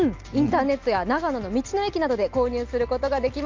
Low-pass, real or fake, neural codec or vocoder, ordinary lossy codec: 7.2 kHz; real; none; Opus, 24 kbps